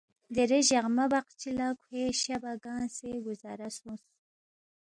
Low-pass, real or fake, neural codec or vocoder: 9.9 kHz; real; none